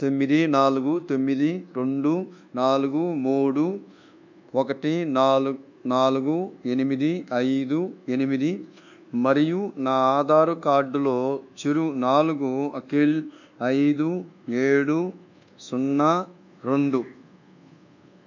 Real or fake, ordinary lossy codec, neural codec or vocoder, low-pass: fake; none; codec, 24 kHz, 1.2 kbps, DualCodec; 7.2 kHz